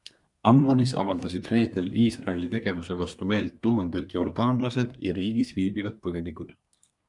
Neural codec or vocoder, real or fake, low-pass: codec, 24 kHz, 1 kbps, SNAC; fake; 10.8 kHz